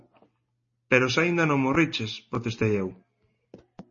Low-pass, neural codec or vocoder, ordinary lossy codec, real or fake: 7.2 kHz; none; MP3, 32 kbps; real